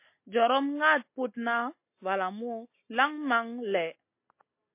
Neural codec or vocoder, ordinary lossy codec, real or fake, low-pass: none; MP3, 24 kbps; real; 3.6 kHz